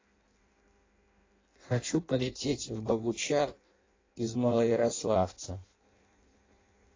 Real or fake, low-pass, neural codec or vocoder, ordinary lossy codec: fake; 7.2 kHz; codec, 16 kHz in and 24 kHz out, 0.6 kbps, FireRedTTS-2 codec; AAC, 32 kbps